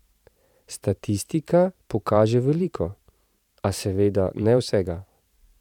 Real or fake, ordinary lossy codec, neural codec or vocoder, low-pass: fake; none; vocoder, 44.1 kHz, 128 mel bands every 512 samples, BigVGAN v2; 19.8 kHz